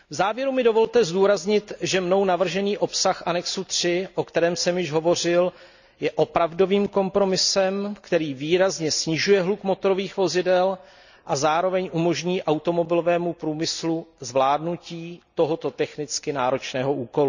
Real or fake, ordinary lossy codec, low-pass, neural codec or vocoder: real; none; 7.2 kHz; none